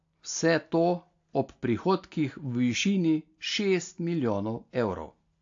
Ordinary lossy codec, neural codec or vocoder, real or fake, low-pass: AAC, 48 kbps; none; real; 7.2 kHz